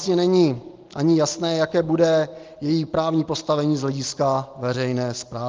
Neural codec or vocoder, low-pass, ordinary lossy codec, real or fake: none; 7.2 kHz; Opus, 24 kbps; real